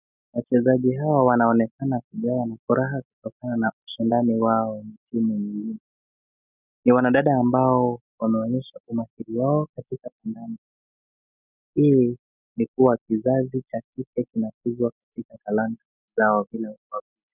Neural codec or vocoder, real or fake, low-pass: none; real; 3.6 kHz